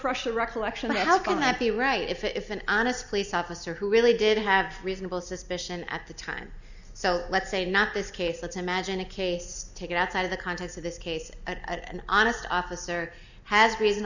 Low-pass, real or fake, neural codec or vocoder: 7.2 kHz; real; none